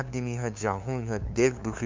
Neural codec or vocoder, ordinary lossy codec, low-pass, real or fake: codec, 16 kHz, 2 kbps, FunCodec, trained on LibriTTS, 25 frames a second; MP3, 64 kbps; 7.2 kHz; fake